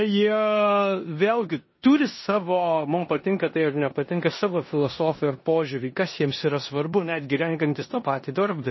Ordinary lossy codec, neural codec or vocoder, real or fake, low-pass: MP3, 24 kbps; codec, 16 kHz in and 24 kHz out, 0.9 kbps, LongCat-Audio-Codec, four codebook decoder; fake; 7.2 kHz